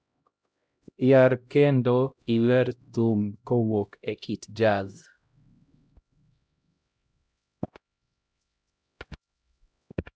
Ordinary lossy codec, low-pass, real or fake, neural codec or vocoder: none; none; fake; codec, 16 kHz, 0.5 kbps, X-Codec, HuBERT features, trained on LibriSpeech